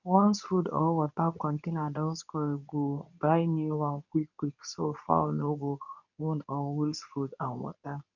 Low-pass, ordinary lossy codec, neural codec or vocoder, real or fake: 7.2 kHz; none; codec, 24 kHz, 0.9 kbps, WavTokenizer, medium speech release version 2; fake